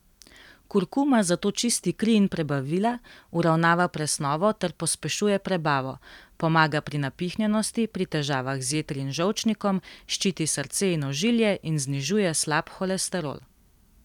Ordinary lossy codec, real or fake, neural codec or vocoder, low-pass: none; fake; vocoder, 44.1 kHz, 128 mel bands every 256 samples, BigVGAN v2; 19.8 kHz